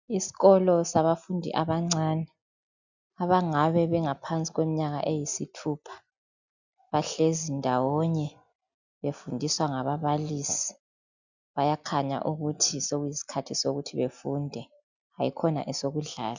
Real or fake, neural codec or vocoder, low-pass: real; none; 7.2 kHz